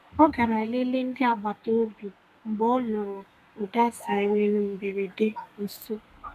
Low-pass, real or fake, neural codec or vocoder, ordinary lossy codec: 14.4 kHz; fake; codec, 32 kHz, 1.9 kbps, SNAC; none